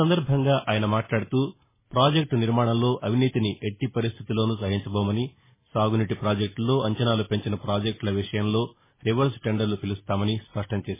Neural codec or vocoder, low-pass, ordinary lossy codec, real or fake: none; 3.6 kHz; MP3, 16 kbps; real